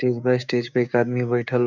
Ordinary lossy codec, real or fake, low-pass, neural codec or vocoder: none; fake; 7.2 kHz; codec, 16 kHz, 6 kbps, DAC